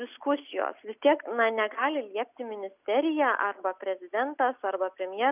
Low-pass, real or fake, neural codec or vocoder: 3.6 kHz; real; none